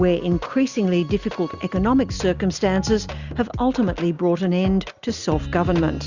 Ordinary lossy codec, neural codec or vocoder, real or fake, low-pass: Opus, 64 kbps; none; real; 7.2 kHz